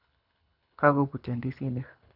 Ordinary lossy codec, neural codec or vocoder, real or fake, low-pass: none; codec, 24 kHz, 6 kbps, HILCodec; fake; 5.4 kHz